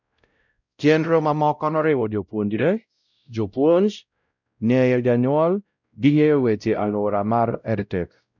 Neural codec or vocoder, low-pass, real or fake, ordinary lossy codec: codec, 16 kHz, 0.5 kbps, X-Codec, WavLM features, trained on Multilingual LibriSpeech; 7.2 kHz; fake; none